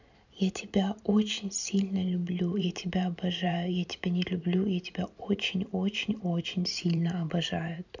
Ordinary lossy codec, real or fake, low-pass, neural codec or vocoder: none; fake; 7.2 kHz; vocoder, 44.1 kHz, 128 mel bands every 512 samples, BigVGAN v2